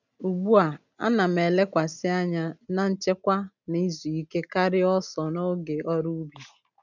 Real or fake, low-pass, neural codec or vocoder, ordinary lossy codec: real; 7.2 kHz; none; none